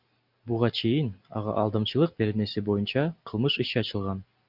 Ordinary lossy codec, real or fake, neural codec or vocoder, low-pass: Opus, 64 kbps; real; none; 5.4 kHz